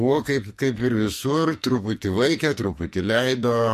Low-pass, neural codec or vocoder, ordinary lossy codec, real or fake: 14.4 kHz; codec, 44.1 kHz, 2.6 kbps, SNAC; MP3, 64 kbps; fake